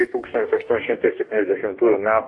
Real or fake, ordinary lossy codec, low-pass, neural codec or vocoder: fake; AAC, 48 kbps; 10.8 kHz; codec, 44.1 kHz, 2.6 kbps, DAC